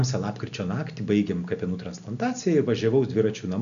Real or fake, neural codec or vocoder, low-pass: real; none; 7.2 kHz